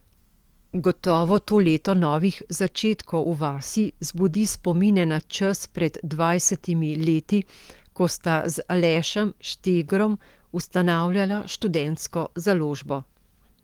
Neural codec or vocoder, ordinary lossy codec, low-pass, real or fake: codec, 44.1 kHz, 7.8 kbps, DAC; Opus, 24 kbps; 19.8 kHz; fake